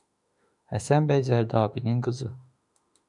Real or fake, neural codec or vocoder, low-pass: fake; autoencoder, 48 kHz, 32 numbers a frame, DAC-VAE, trained on Japanese speech; 10.8 kHz